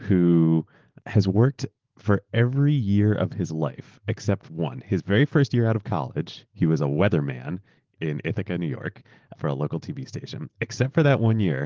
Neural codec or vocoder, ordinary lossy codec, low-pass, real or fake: none; Opus, 16 kbps; 7.2 kHz; real